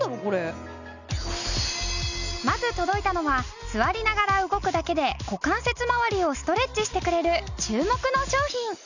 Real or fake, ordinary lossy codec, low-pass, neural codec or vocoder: real; none; 7.2 kHz; none